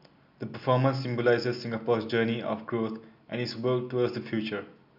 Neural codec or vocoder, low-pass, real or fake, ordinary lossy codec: none; 5.4 kHz; real; none